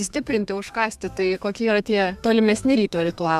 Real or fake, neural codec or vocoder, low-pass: fake; codec, 32 kHz, 1.9 kbps, SNAC; 14.4 kHz